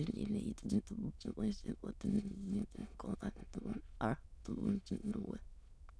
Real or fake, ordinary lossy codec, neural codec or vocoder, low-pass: fake; none; autoencoder, 22.05 kHz, a latent of 192 numbers a frame, VITS, trained on many speakers; none